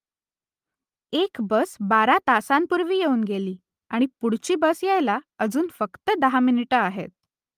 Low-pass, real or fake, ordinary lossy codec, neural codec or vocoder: 14.4 kHz; real; Opus, 32 kbps; none